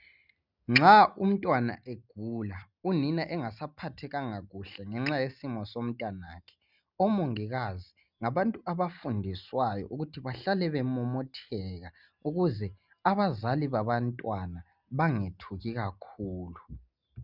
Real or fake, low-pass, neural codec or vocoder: real; 5.4 kHz; none